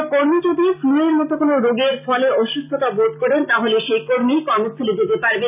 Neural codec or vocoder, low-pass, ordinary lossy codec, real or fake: none; 3.6 kHz; none; real